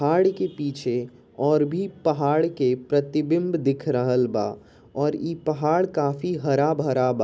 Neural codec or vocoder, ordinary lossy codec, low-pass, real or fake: none; none; none; real